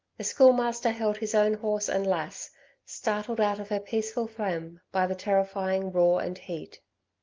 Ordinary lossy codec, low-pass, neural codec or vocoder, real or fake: Opus, 24 kbps; 7.2 kHz; none; real